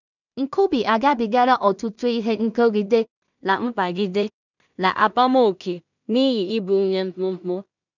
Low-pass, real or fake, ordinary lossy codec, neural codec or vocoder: 7.2 kHz; fake; none; codec, 16 kHz in and 24 kHz out, 0.4 kbps, LongCat-Audio-Codec, two codebook decoder